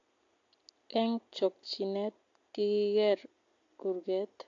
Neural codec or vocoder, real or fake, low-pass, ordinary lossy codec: none; real; 7.2 kHz; AAC, 48 kbps